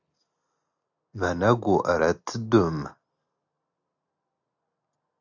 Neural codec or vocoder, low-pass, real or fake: none; 7.2 kHz; real